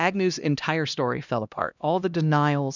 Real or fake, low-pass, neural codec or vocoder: fake; 7.2 kHz; codec, 16 kHz, 1 kbps, X-Codec, HuBERT features, trained on LibriSpeech